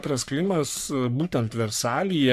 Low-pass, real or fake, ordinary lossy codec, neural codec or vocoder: 14.4 kHz; fake; AAC, 96 kbps; codec, 44.1 kHz, 3.4 kbps, Pupu-Codec